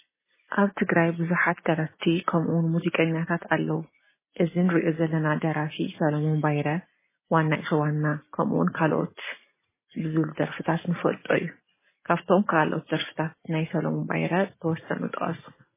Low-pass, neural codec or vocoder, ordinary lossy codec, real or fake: 3.6 kHz; none; MP3, 16 kbps; real